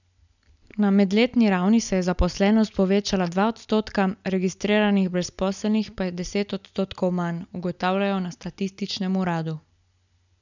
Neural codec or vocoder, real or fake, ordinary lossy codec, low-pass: none; real; none; 7.2 kHz